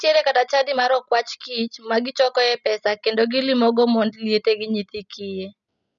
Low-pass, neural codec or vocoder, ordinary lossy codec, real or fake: 7.2 kHz; none; none; real